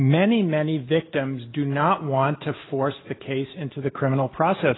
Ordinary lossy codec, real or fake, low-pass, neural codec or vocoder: AAC, 16 kbps; real; 7.2 kHz; none